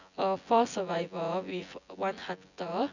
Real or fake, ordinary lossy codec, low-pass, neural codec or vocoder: fake; none; 7.2 kHz; vocoder, 24 kHz, 100 mel bands, Vocos